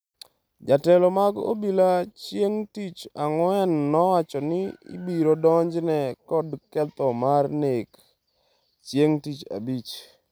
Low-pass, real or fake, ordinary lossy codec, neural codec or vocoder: none; real; none; none